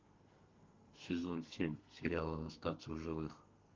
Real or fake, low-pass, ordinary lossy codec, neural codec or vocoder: fake; 7.2 kHz; Opus, 32 kbps; codec, 32 kHz, 1.9 kbps, SNAC